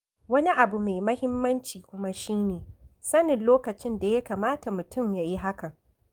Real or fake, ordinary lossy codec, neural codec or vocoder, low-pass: fake; Opus, 32 kbps; codec, 44.1 kHz, 7.8 kbps, DAC; 19.8 kHz